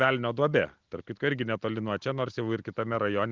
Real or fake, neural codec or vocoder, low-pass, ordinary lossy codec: real; none; 7.2 kHz; Opus, 32 kbps